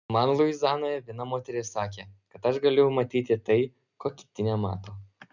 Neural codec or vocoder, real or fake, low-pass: none; real; 7.2 kHz